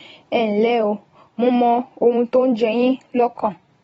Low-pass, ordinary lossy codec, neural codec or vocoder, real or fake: 19.8 kHz; AAC, 24 kbps; vocoder, 44.1 kHz, 128 mel bands every 256 samples, BigVGAN v2; fake